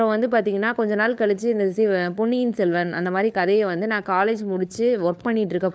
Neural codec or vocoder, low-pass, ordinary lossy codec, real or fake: codec, 16 kHz, 4 kbps, FunCodec, trained on LibriTTS, 50 frames a second; none; none; fake